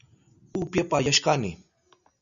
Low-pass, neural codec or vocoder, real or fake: 7.2 kHz; none; real